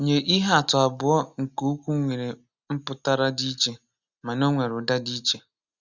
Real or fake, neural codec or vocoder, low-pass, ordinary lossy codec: real; none; 7.2 kHz; Opus, 64 kbps